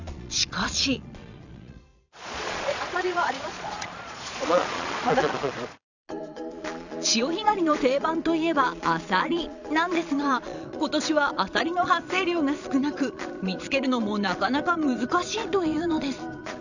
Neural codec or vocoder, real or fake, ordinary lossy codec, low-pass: vocoder, 22.05 kHz, 80 mel bands, Vocos; fake; none; 7.2 kHz